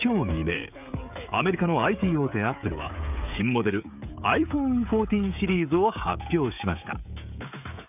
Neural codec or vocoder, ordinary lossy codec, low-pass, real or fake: codec, 16 kHz, 16 kbps, FreqCodec, smaller model; none; 3.6 kHz; fake